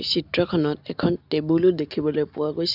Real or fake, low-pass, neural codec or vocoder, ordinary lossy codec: real; 5.4 kHz; none; none